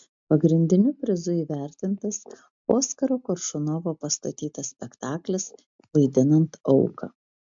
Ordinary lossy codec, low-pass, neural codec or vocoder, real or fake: MP3, 64 kbps; 7.2 kHz; none; real